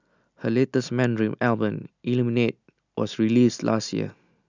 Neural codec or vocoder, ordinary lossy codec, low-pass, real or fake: none; none; 7.2 kHz; real